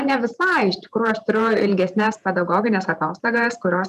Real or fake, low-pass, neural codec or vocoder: fake; 14.4 kHz; vocoder, 48 kHz, 128 mel bands, Vocos